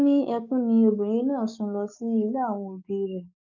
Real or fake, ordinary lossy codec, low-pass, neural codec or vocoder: fake; none; 7.2 kHz; codec, 44.1 kHz, 7.8 kbps, DAC